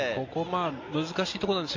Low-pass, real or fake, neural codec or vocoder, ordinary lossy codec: 7.2 kHz; real; none; AAC, 48 kbps